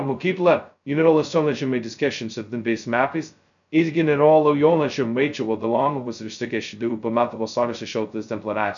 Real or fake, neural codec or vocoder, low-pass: fake; codec, 16 kHz, 0.2 kbps, FocalCodec; 7.2 kHz